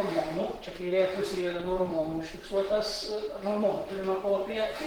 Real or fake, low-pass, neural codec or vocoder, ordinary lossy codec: fake; 19.8 kHz; vocoder, 44.1 kHz, 128 mel bands, Pupu-Vocoder; Opus, 16 kbps